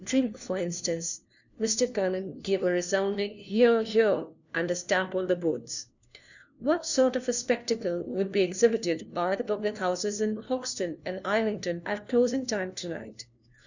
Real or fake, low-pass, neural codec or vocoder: fake; 7.2 kHz; codec, 16 kHz, 1 kbps, FunCodec, trained on LibriTTS, 50 frames a second